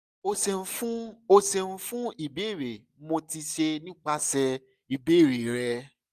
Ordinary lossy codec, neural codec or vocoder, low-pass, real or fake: none; none; 14.4 kHz; real